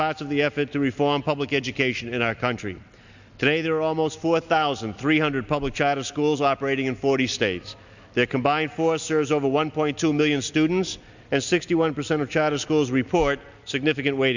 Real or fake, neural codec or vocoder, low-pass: real; none; 7.2 kHz